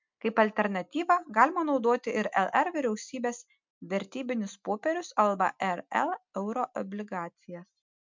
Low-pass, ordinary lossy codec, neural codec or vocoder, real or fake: 7.2 kHz; MP3, 64 kbps; none; real